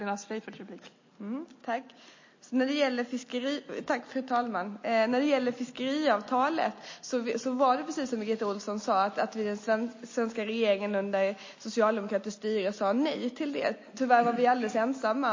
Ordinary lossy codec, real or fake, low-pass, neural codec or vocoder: MP3, 32 kbps; real; 7.2 kHz; none